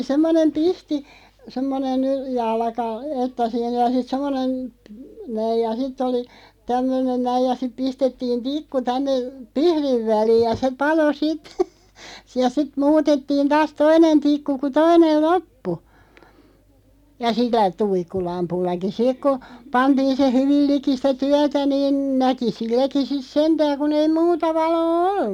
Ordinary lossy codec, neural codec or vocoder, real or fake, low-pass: none; none; real; 19.8 kHz